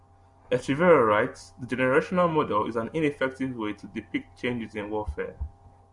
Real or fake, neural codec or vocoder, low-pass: real; none; 10.8 kHz